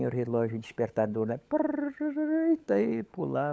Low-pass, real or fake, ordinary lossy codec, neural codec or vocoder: none; fake; none; codec, 16 kHz, 16 kbps, FunCodec, trained on LibriTTS, 50 frames a second